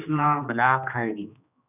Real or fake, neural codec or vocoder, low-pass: fake; codec, 16 kHz, 2 kbps, X-Codec, HuBERT features, trained on general audio; 3.6 kHz